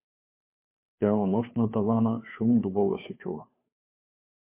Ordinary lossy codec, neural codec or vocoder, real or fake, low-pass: MP3, 24 kbps; codec, 16 kHz, 2 kbps, FunCodec, trained on Chinese and English, 25 frames a second; fake; 3.6 kHz